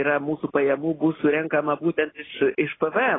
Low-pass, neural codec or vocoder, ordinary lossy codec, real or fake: 7.2 kHz; none; AAC, 16 kbps; real